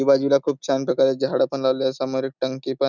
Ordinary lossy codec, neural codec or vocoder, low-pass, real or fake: none; none; 7.2 kHz; real